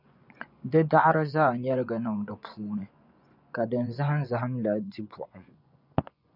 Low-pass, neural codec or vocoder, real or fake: 5.4 kHz; vocoder, 24 kHz, 100 mel bands, Vocos; fake